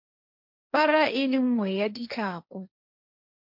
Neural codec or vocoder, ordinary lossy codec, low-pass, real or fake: codec, 16 kHz, 1.1 kbps, Voila-Tokenizer; MP3, 48 kbps; 5.4 kHz; fake